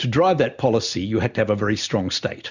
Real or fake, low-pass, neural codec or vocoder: real; 7.2 kHz; none